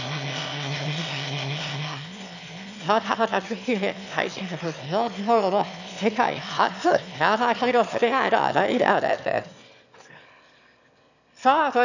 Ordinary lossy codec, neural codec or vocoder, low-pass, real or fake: none; autoencoder, 22.05 kHz, a latent of 192 numbers a frame, VITS, trained on one speaker; 7.2 kHz; fake